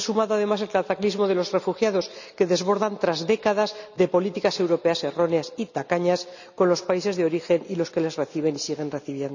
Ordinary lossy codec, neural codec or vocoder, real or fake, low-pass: none; none; real; 7.2 kHz